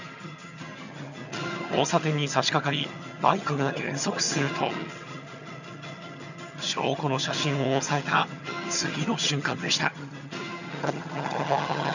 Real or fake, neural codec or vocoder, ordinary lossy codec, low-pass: fake; vocoder, 22.05 kHz, 80 mel bands, HiFi-GAN; none; 7.2 kHz